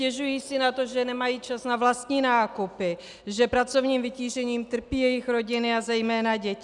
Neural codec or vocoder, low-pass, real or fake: none; 10.8 kHz; real